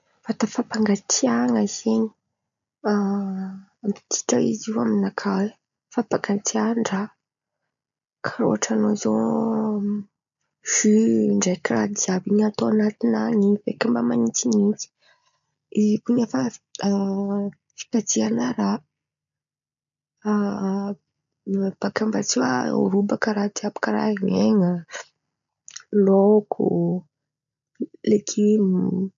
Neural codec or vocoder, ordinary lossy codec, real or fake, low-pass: none; none; real; 7.2 kHz